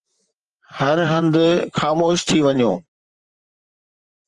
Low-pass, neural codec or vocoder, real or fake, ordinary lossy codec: 10.8 kHz; vocoder, 44.1 kHz, 128 mel bands, Pupu-Vocoder; fake; Opus, 24 kbps